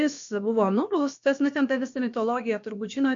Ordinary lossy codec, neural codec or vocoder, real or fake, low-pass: MP3, 48 kbps; codec, 16 kHz, about 1 kbps, DyCAST, with the encoder's durations; fake; 7.2 kHz